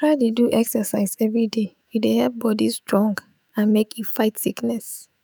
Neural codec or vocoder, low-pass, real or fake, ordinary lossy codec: autoencoder, 48 kHz, 128 numbers a frame, DAC-VAE, trained on Japanese speech; none; fake; none